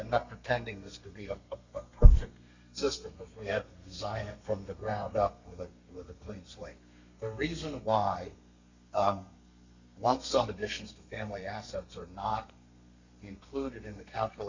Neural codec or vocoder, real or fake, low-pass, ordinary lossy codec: codec, 32 kHz, 1.9 kbps, SNAC; fake; 7.2 kHz; Opus, 64 kbps